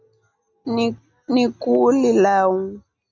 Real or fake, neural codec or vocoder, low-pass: real; none; 7.2 kHz